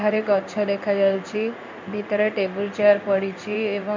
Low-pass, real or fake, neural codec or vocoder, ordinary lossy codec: 7.2 kHz; fake; codec, 16 kHz in and 24 kHz out, 1 kbps, XY-Tokenizer; MP3, 48 kbps